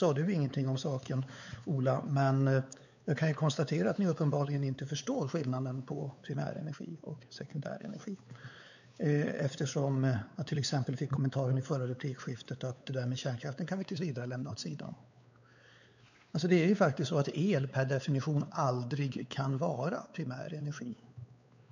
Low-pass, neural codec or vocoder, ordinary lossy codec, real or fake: 7.2 kHz; codec, 16 kHz, 4 kbps, X-Codec, WavLM features, trained on Multilingual LibriSpeech; none; fake